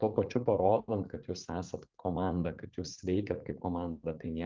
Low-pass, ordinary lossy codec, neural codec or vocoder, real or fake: 7.2 kHz; Opus, 24 kbps; vocoder, 44.1 kHz, 80 mel bands, Vocos; fake